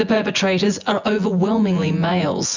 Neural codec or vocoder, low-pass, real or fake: vocoder, 24 kHz, 100 mel bands, Vocos; 7.2 kHz; fake